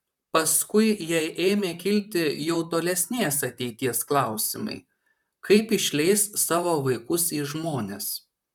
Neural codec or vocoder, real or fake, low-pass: vocoder, 44.1 kHz, 128 mel bands, Pupu-Vocoder; fake; 19.8 kHz